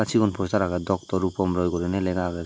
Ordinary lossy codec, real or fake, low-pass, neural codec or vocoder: none; real; none; none